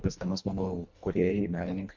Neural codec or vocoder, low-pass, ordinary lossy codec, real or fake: codec, 24 kHz, 1.5 kbps, HILCodec; 7.2 kHz; MP3, 64 kbps; fake